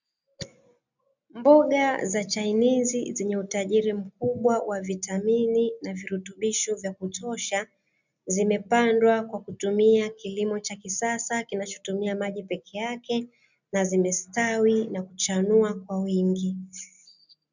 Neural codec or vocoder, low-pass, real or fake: none; 7.2 kHz; real